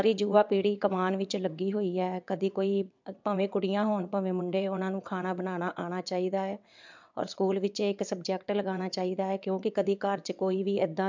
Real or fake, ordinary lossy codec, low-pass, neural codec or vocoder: fake; MP3, 64 kbps; 7.2 kHz; vocoder, 22.05 kHz, 80 mel bands, WaveNeXt